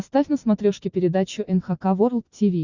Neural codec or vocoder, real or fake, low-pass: none; real; 7.2 kHz